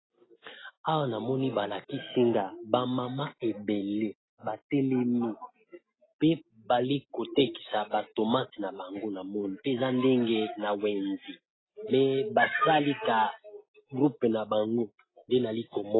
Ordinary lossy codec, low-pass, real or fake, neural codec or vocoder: AAC, 16 kbps; 7.2 kHz; real; none